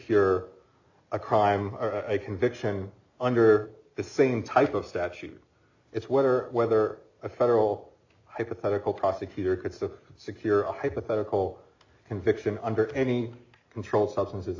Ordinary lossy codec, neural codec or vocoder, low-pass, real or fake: AAC, 48 kbps; none; 7.2 kHz; real